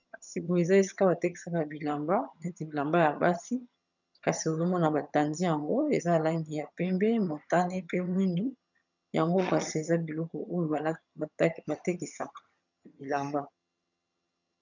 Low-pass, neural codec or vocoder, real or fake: 7.2 kHz; vocoder, 22.05 kHz, 80 mel bands, HiFi-GAN; fake